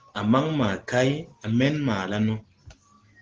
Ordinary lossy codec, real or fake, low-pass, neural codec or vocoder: Opus, 16 kbps; real; 7.2 kHz; none